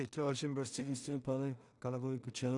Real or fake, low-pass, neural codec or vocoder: fake; 10.8 kHz; codec, 16 kHz in and 24 kHz out, 0.4 kbps, LongCat-Audio-Codec, two codebook decoder